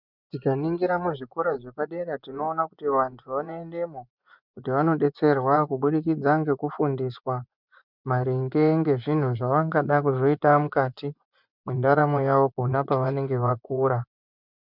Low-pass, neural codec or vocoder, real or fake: 5.4 kHz; vocoder, 24 kHz, 100 mel bands, Vocos; fake